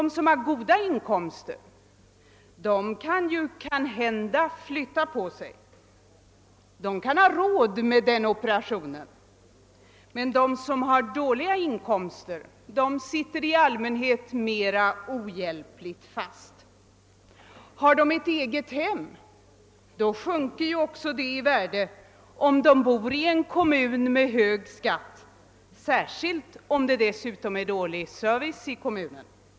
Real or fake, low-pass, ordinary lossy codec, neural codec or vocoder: real; none; none; none